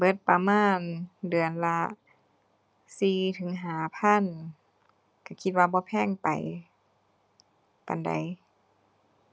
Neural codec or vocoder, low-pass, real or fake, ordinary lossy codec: none; none; real; none